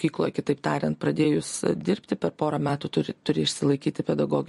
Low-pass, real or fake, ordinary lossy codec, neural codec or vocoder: 14.4 kHz; fake; MP3, 48 kbps; vocoder, 44.1 kHz, 128 mel bands every 256 samples, BigVGAN v2